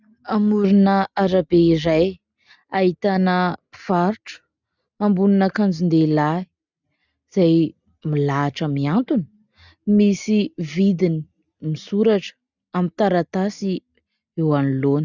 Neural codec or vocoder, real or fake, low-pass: none; real; 7.2 kHz